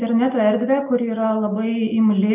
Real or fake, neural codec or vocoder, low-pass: real; none; 3.6 kHz